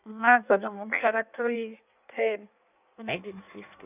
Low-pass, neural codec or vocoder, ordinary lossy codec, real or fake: 3.6 kHz; codec, 16 kHz in and 24 kHz out, 1.1 kbps, FireRedTTS-2 codec; none; fake